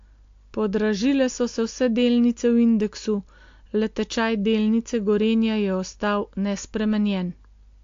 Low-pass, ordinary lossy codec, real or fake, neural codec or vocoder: 7.2 kHz; AAC, 48 kbps; real; none